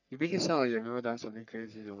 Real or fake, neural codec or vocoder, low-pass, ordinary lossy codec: fake; codec, 44.1 kHz, 3.4 kbps, Pupu-Codec; 7.2 kHz; none